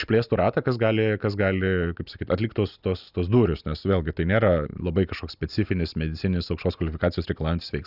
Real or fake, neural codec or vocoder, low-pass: real; none; 5.4 kHz